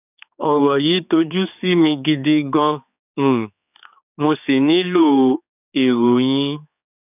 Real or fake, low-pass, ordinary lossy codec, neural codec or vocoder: fake; 3.6 kHz; none; codec, 16 kHz, 4 kbps, X-Codec, HuBERT features, trained on general audio